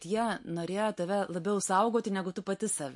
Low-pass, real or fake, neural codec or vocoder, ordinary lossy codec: 10.8 kHz; real; none; MP3, 48 kbps